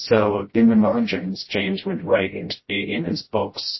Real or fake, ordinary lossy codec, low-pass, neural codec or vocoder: fake; MP3, 24 kbps; 7.2 kHz; codec, 16 kHz, 0.5 kbps, FreqCodec, smaller model